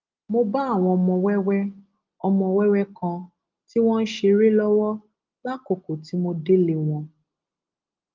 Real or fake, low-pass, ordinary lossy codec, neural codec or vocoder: real; 7.2 kHz; Opus, 32 kbps; none